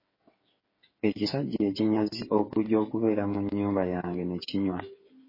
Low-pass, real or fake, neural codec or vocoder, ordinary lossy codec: 5.4 kHz; fake; codec, 16 kHz, 8 kbps, FreqCodec, smaller model; MP3, 24 kbps